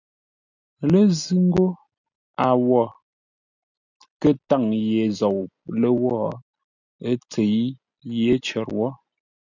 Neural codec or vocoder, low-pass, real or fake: none; 7.2 kHz; real